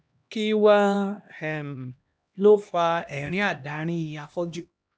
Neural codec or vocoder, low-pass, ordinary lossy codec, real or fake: codec, 16 kHz, 1 kbps, X-Codec, HuBERT features, trained on LibriSpeech; none; none; fake